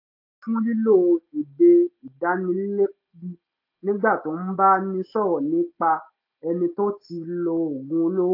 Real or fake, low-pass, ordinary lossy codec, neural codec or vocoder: real; 5.4 kHz; AAC, 32 kbps; none